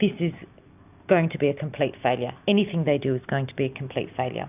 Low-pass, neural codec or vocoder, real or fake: 3.6 kHz; none; real